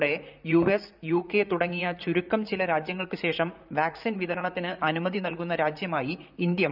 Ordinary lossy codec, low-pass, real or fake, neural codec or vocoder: none; 5.4 kHz; fake; vocoder, 44.1 kHz, 128 mel bands, Pupu-Vocoder